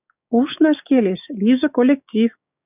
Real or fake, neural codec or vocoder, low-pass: fake; codec, 16 kHz, 6 kbps, DAC; 3.6 kHz